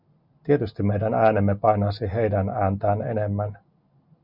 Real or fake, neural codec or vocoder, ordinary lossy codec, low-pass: real; none; Opus, 64 kbps; 5.4 kHz